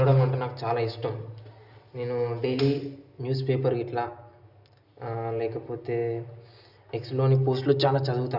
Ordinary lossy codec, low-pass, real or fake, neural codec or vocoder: none; 5.4 kHz; real; none